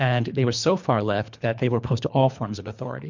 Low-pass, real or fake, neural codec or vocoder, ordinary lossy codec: 7.2 kHz; fake; codec, 24 kHz, 3 kbps, HILCodec; MP3, 64 kbps